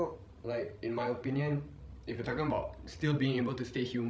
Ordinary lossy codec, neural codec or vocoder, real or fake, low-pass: none; codec, 16 kHz, 16 kbps, FreqCodec, larger model; fake; none